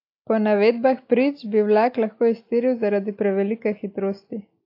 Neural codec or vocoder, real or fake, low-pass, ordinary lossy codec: none; real; 5.4 kHz; AAC, 32 kbps